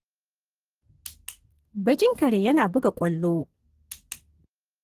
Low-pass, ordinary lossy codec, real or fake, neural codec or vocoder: 14.4 kHz; Opus, 16 kbps; fake; codec, 44.1 kHz, 2.6 kbps, SNAC